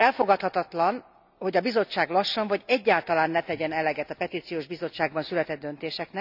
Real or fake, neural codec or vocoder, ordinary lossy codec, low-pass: real; none; none; 5.4 kHz